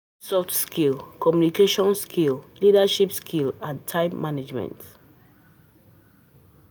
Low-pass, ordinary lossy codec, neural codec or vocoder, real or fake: none; none; none; real